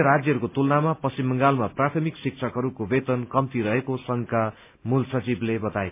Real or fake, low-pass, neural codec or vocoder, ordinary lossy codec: real; 3.6 kHz; none; MP3, 32 kbps